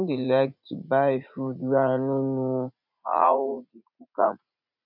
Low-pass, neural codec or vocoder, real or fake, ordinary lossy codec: 5.4 kHz; vocoder, 44.1 kHz, 80 mel bands, Vocos; fake; none